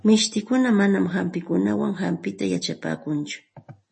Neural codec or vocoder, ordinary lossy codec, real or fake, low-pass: none; MP3, 32 kbps; real; 10.8 kHz